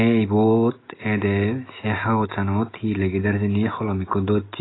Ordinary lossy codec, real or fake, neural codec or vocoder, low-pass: AAC, 16 kbps; real; none; 7.2 kHz